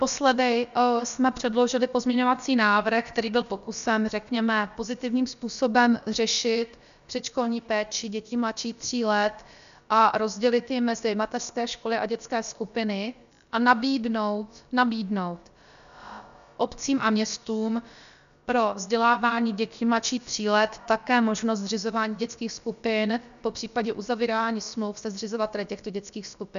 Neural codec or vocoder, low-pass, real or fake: codec, 16 kHz, about 1 kbps, DyCAST, with the encoder's durations; 7.2 kHz; fake